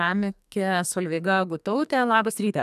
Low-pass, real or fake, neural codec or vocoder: 14.4 kHz; fake; codec, 44.1 kHz, 2.6 kbps, SNAC